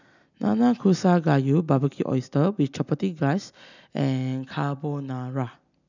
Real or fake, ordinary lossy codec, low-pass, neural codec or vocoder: real; none; 7.2 kHz; none